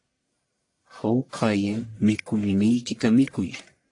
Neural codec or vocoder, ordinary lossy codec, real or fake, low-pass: codec, 44.1 kHz, 1.7 kbps, Pupu-Codec; AAC, 48 kbps; fake; 10.8 kHz